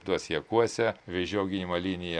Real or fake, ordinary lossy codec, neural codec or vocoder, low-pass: real; AAC, 64 kbps; none; 9.9 kHz